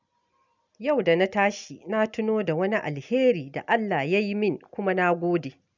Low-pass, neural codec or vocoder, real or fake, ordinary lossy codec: 7.2 kHz; none; real; none